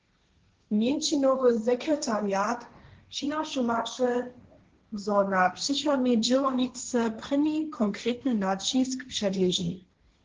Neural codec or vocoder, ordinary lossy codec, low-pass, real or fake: codec, 16 kHz, 1.1 kbps, Voila-Tokenizer; Opus, 16 kbps; 7.2 kHz; fake